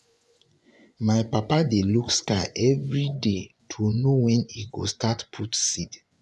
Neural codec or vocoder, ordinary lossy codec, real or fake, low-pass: vocoder, 24 kHz, 100 mel bands, Vocos; none; fake; none